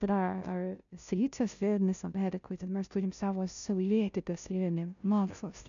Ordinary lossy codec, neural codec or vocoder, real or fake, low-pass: MP3, 96 kbps; codec, 16 kHz, 0.5 kbps, FunCodec, trained on Chinese and English, 25 frames a second; fake; 7.2 kHz